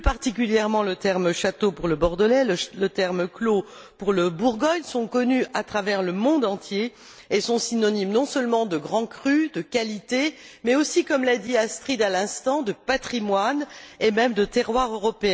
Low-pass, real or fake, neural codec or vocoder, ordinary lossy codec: none; real; none; none